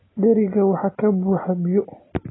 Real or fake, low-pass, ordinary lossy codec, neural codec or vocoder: real; 7.2 kHz; AAC, 16 kbps; none